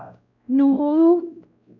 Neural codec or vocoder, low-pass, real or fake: codec, 16 kHz, 0.5 kbps, X-Codec, WavLM features, trained on Multilingual LibriSpeech; 7.2 kHz; fake